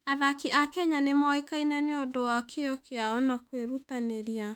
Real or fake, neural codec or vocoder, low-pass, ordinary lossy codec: fake; autoencoder, 48 kHz, 32 numbers a frame, DAC-VAE, trained on Japanese speech; 19.8 kHz; none